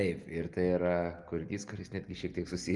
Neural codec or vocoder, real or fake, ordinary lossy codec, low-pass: none; real; Opus, 32 kbps; 10.8 kHz